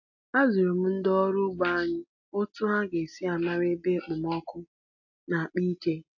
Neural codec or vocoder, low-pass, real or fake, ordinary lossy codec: none; 7.2 kHz; real; AAC, 48 kbps